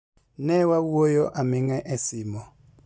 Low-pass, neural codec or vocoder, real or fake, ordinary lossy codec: none; none; real; none